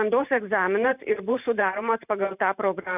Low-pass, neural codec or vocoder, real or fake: 3.6 kHz; vocoder, 44.1 kHz, 128 mel bands every 512 samples, BigVGAN v2; fake